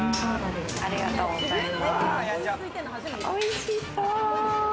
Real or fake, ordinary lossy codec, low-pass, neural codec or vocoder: real; none; none; none